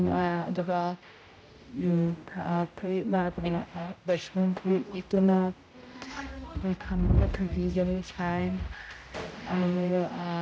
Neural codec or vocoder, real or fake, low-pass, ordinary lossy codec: codec, 16 kHz, 0.5 kbps, X-Codec, HuBERT features, trained on general audio; fake; none; none